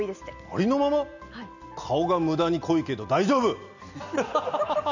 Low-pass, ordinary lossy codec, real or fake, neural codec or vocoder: 7.2 kHz; none; real; none